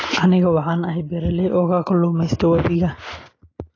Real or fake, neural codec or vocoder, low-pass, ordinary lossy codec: real; none; 7.2 kHz; AAC, 32 kbps